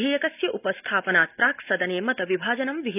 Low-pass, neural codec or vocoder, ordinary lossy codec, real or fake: 3.6 kHz; none; none; real